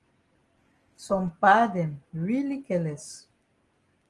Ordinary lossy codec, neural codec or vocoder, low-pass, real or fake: Opus, 24 kbps; none; 10.8 kHz; real